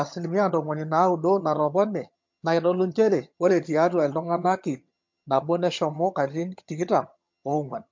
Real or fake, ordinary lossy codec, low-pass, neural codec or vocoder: fake; MP3, 48 kbps; 7.2 kHz; vocoder, 22.05 kHz, 80 mel bands, HiFi-GAN